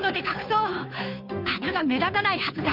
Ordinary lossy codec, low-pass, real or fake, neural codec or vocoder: none; 5.4 kHz; fake; codec, 16 kHz, 2 kbps, FunCodec, trained on Chinese and English, 25 frames a second